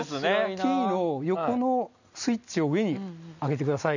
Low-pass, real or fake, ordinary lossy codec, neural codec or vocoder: 7.2 kHz; real; none; none